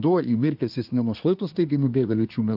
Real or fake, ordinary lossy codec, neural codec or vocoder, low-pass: fake; AAC, 48 kbps; codec, 24 kHz, 1 kbps, SNAC; 5.4 kHz